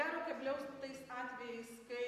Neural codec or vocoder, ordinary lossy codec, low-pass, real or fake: none; AAC, 64 kbps; 14.4 kHz; real